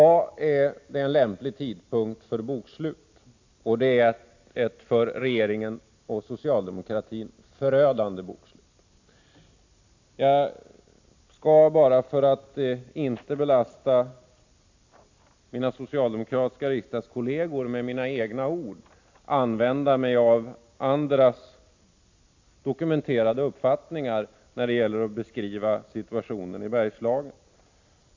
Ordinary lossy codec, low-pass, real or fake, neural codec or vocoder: AAC, 48 kbps; 7.2 kHz; real; none